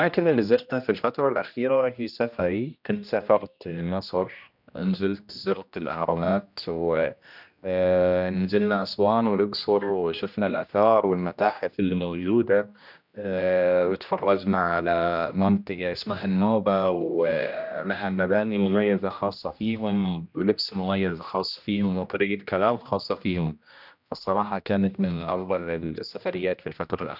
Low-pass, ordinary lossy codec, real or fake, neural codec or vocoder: 5.4 kHz; none; fake; codec, 16 kHz, 1 kbps, X-Codec, HuBERT features, trained on general audio